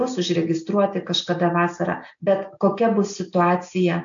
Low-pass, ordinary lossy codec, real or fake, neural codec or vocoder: 7.2 kHz; AAC, 64 kbps; real; none